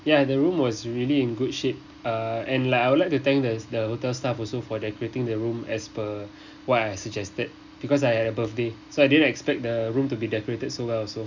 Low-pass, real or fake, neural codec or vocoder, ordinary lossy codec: 7.2 kHz; real; none; none